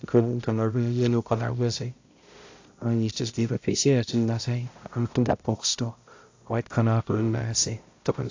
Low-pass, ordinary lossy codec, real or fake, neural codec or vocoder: 7.2 kHz; AAC, 48 kbps; fake; codec, 16 kHz, 0.5 kbps, X-Codec, HuBERT features, trained on balanced general audio